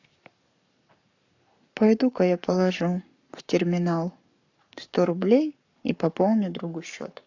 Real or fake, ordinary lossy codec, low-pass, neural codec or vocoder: fake; AAC, 48 kbps; 7.2 kHz; codec, 44.1 kHz, 7.8 kbps, Pupu-Codec